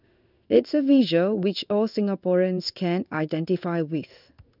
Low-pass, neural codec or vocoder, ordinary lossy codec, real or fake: 5.4 kHz; codec, 16 kHz in and 24 kHz out, 1 kbps, XY-Tokenizer; none; fake